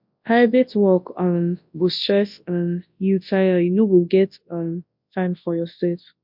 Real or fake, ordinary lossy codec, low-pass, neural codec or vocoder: fake; MP3, 48 kbps; 5.4 kHz; codec, 24 kHz, 0.9 kbps, WavTokenizer, large speech release